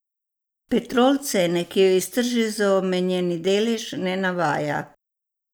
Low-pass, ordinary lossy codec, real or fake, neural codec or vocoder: none; none; real; none